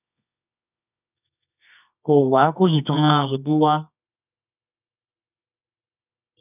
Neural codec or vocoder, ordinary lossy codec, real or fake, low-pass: codec, 24 kHz, 0.9 kbps, WavTokenizer, medium music audio release; none; fake; 3.6 kHz